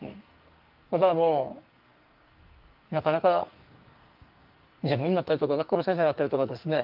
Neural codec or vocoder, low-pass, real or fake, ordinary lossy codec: codec, 44.1 kHz, 2.6 kbps, SNAC; 5.4 kHz; fake; Opus, 32 kbps